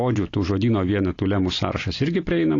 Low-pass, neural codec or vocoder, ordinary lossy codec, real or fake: 7.2 kHz; none; AAC, 32 kbps; real